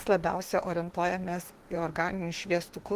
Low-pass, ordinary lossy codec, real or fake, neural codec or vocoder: 14.4 kHz; Opus, 16 kbps; fake; autoencoder, 48 kHz, 32 numbers a frame, DAC-VAE, trained on Japanese speech